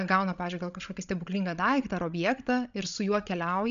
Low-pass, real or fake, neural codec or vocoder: 7.2 kHz; fake; codec, 16 kHz, 16 kbps, FreqCodec, larger model